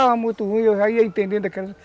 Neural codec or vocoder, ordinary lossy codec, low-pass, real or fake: none; none; none; real